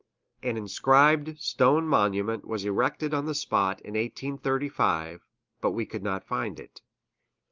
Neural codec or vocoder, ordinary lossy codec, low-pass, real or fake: none; Opus, 32 kbps; 7.2 kHz; real